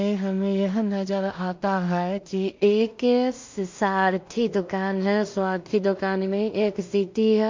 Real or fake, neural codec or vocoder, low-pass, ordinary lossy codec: fake; codec, 16 kHz in and 24 kHz out, 0.4 kbps, LongCat-Audio-Codec, two codebook decoder; 7.2 kHz; MP3, 48 kbps